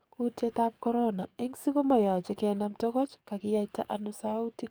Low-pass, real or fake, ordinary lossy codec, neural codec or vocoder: none; fake; none; codec, 44.1 kHz, 7.8 kbps, DAC